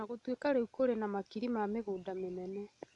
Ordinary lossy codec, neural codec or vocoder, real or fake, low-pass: none; none; real; 10.8 kHz